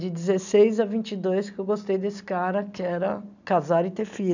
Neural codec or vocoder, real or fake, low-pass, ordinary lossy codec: none; real; 7.2 kHz; none